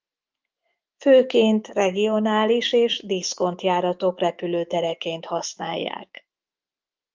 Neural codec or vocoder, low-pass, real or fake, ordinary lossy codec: autoencoder, 48 kHz, 128 numbers a frame, DAC-VAE, trained on Japanese speech; 7.2 kHz; fake; Opus, 24 kbps